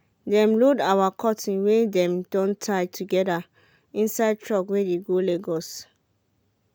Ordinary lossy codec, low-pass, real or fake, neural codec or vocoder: none; none; real; none